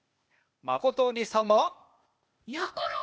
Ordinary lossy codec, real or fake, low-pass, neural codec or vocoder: none; fake; none; codec, 16 kHz, 0.8 kbps, ZipCodec